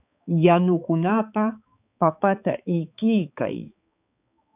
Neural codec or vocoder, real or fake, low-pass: codec, 16 kHz, 4 kbps, X-Codec, HuBERT features, trained on balanced general audio; fake; 3.6 kHz